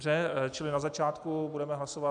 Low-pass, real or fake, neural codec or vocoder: 9.9 kHz; real; none